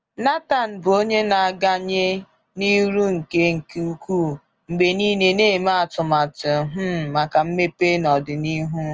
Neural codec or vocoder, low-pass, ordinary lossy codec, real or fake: none; 7.2 kHz; Opus, 24 kbps; real